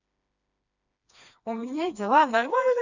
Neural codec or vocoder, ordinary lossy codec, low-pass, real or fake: codec, 16 kHz, 2 kbps, FreqCodec, smaller model; none; 7.2 kHz; fake